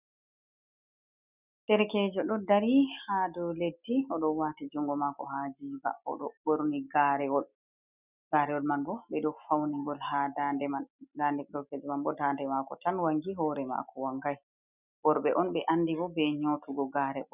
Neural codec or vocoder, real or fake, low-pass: none; real; 3.6 kHz